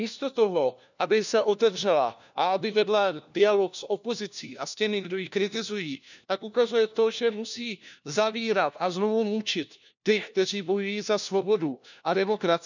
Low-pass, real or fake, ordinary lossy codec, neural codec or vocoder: 7.2 kHz; fake; none; codec, 16 kHz, 1 kbps, FunCodec, trained on LibriTTS, 50 frames a second